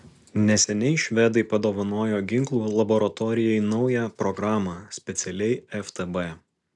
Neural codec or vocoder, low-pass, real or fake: none; 10.8 kHz; real